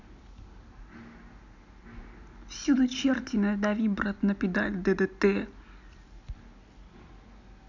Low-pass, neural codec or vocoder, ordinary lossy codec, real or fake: 7.2 kHz; none; none; real